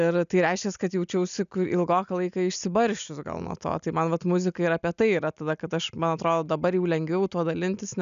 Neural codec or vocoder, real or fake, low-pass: none; real; 7.2 kHz